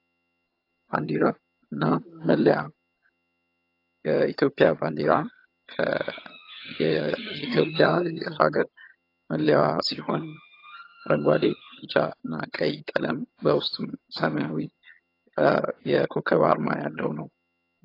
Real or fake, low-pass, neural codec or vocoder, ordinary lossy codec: fake; 5.4 kHz; vocoder, 22.05 kHz, 80 mel bands, HiFi-GAN; AAC, 32 kbps